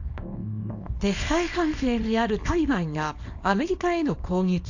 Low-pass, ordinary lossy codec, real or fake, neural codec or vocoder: 7.2 kHz; AAC, 48 kbps; fake; codec, 24 kHz, 0.9 kbps, WavTokenizer, small release